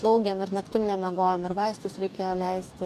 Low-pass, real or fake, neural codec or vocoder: 14.4 kHz; fake; codec, 44.1 kHz, 2.6 kbps, DAC